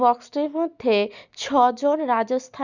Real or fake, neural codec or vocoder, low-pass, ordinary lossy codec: real; none; 7.2 kHz; none